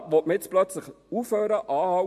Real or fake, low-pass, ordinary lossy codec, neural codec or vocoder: real; 14.4 kHz; MP3, 64 kbps; none